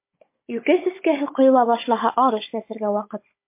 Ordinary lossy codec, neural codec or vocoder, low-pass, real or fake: MP3, 24 kbps; codec, 16 kHz, 16 kbps, FunCodec, trained on Chinese and English, 50 frames a second; 3.6 kHz; fake